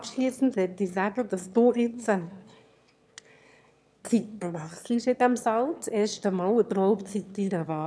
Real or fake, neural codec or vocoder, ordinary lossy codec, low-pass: fake; autoencoder, 22.05 kHz, a latent of 192 numbers a frame, VITS, trained on one speaker; none; none